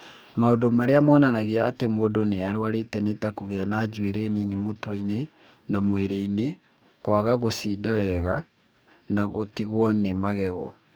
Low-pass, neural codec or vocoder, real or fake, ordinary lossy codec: none; codec, 44.1 kHz, 2.6 kbps, DAC; fake; none